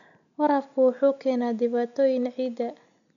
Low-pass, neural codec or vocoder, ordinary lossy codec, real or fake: 7.2 kHz; none; none; real